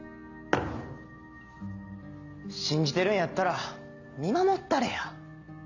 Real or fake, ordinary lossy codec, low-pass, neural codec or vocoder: real; none; 7.2 kHz; none